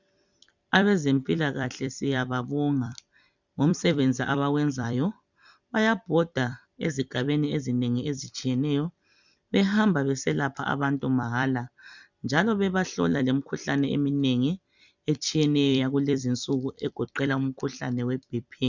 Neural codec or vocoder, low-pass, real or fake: vocoder, 44.1 kHz, 128 mel bands every 256 samples, BigVGAN v2; 7.2 kHz; fake